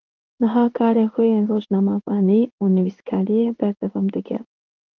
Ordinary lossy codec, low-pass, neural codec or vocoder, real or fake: Opus, 32 kbps; 7.2 kHz; codec, 16 kHz in and 24 kHz out, 1 kbps, XY-Tokenizer; fake